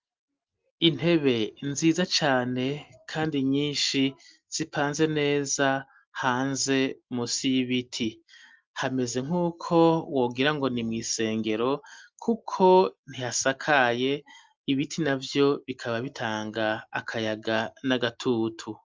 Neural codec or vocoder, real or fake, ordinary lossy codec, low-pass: none; real; Opus, 32 kbps; 7.2 kHz